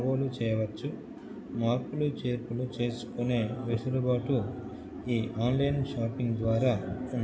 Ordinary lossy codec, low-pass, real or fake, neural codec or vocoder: none; none; real; none